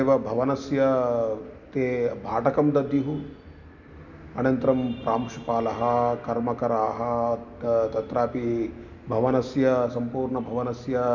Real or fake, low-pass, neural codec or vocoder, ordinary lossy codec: real; 7.2 kHz; none; none